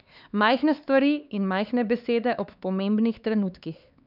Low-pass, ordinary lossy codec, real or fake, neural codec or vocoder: 5.4 kHz; none; fake; codec, 16 kHz, 4 kbps, X-Codec, HuBERT features, trained on LibriSpeech